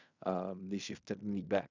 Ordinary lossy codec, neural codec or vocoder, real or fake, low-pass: none; codec, 16 kHz in and 24 kHz out, 0.4 kbps, LongCat-Audio-Codec, fine tuned four codebook decoder; fake; 7.2 kHz